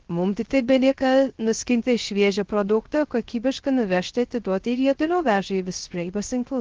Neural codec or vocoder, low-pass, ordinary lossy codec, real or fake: codec, 16 kHz, 0.3 kbps, FocalCodec; 7.2 kHz; Opus, 16 kbps; fake